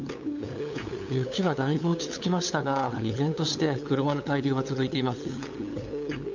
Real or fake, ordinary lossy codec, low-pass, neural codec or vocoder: fake; none; 7.2 kHz; codec, 16 kHz, 8 kbps, FunCodec, trained on LibriTTS, 25 frames a second